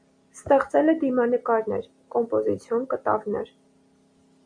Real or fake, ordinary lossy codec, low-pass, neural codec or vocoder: real; MP3, 48 kbps; 9.9 kHz; none